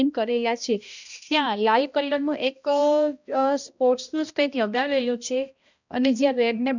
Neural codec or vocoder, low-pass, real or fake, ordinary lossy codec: codec, 16 kHz, 1 kbps, X-Codec, HuBERT features, trained on balanced general audio; 7.2 kHz; fake; none